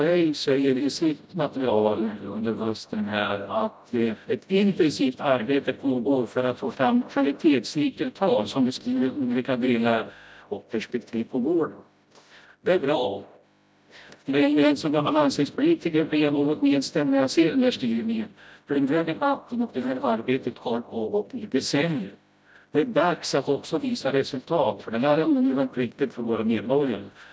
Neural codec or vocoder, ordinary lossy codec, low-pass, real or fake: codec, 16 kHz, 0.5 kbps, FreqCodec, smaller model; none; none; fake